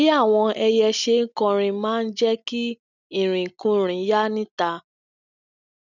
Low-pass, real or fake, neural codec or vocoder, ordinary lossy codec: 7.2 kHz; real; none; none